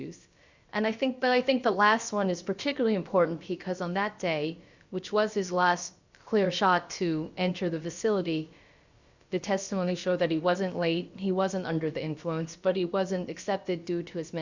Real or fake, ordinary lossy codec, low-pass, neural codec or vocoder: fake; Opus, 64 kbps; 7.2 kHz; codec, 16 kHz, about 1 kbps, DyCAST, with the encoder's durations